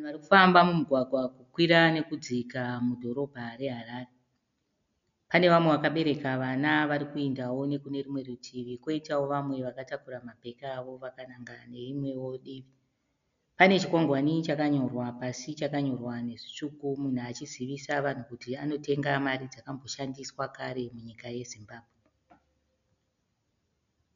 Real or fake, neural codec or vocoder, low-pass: real; none; 7.2 kHz